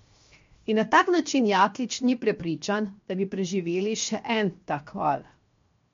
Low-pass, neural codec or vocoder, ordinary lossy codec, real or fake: 7.2 kHz; codec, 16 kHz, 0.7 kbps, FocalCodec; MP3, 48 kbps; fake